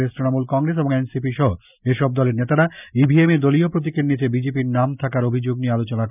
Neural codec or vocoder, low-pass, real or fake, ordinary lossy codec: none; 3.6 kHz; real; none